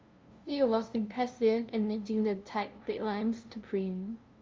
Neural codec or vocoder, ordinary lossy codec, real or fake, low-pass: codec, 16 kHz, 0.5 kbps, FunCodec, trained on LibriTTS, 25 frames a second; Opus, 32 kbps; fake; 7.2 kHz